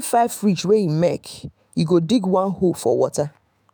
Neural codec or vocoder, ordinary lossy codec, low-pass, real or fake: autoencoder, 48 kHz, 128 numbers a frame, DAC-VAE, trained on Japanese speech; none; none; fake